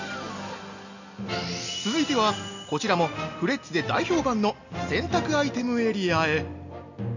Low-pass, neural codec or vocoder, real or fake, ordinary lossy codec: 7.2 kHz; none; real; none